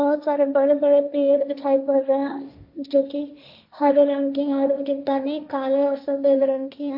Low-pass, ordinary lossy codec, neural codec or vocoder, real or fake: 5.4 kHz; AAC, 48 kbps; codec, 16 kHz, 1.1 kbps, Voila-Tokenizer; fake